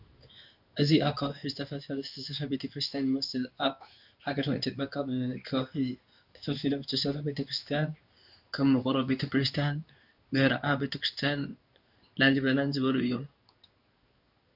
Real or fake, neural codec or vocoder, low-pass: fake; codec, 16 kHz in and 24 kHz out, 1 kbps, XY-Tokenizer; 5.4 kHz